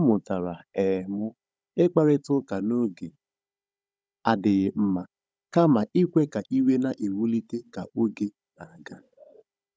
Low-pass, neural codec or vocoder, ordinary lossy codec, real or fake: none; codec, 16 kHz, 16 kbps, FunCodec, trained on Chinese and English, 50 frames a second; none; fake